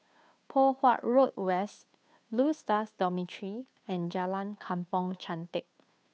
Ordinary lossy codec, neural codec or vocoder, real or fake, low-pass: none; codec, 16 kHz, 2 kbps, FunCodec, trained on Chinese and English, 25 frames a second; fake; none